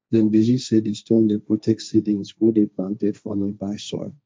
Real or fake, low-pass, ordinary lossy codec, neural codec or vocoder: fake; none; none; codec, 16 kHz, 1.1 kbps, Voila-Tokenizer